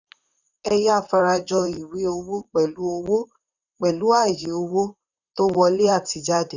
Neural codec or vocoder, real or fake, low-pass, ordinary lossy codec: vocoder, 44.1 kHz, 128 mel bands, Pupu-Vocoder; fake; 7.2 kHz; none